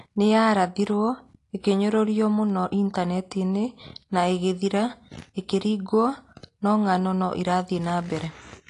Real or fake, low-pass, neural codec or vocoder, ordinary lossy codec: real; 10.8 kHz; none; AAC, 48 kbps